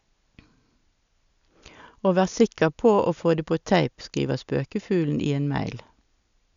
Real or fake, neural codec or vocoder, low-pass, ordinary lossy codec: real; none; 7.2 kHz; none